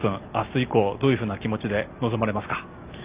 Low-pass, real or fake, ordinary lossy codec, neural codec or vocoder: 3.6 kHz; real; Opus, 24 kbps; none